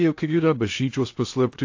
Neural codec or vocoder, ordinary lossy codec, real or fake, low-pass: codec, 16 kHz in and 24 kHz out, 0.6 kbps, FocalCodec, streaming, 2048 codes; AAC, 48 kbps; fake; 7.2 kHz